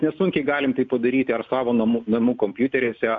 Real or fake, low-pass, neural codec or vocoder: real; 7.2 kHz; none